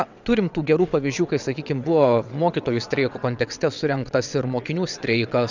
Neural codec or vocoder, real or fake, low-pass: vocoder, 22.05 kHz, 80 mel bands, WaveNeXt; fake; 7.2 kHz